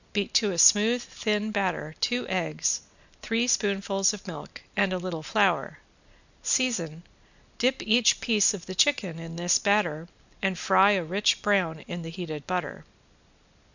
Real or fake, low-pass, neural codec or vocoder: real; 7.2 kHz; none